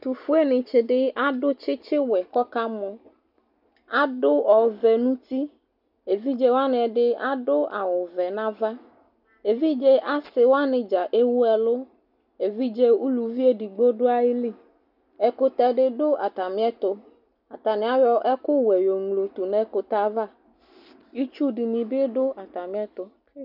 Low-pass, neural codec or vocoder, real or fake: 5.4 kHz; none; real